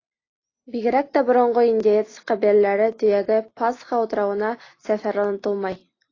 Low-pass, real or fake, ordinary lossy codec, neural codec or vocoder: 7.2 kHz; real; AAC, 32 kbps; none